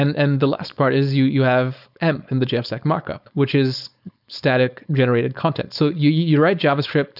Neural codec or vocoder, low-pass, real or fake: codec, 16 kHz, 4.8 kbps, FACodec; 5.4 kHz; fake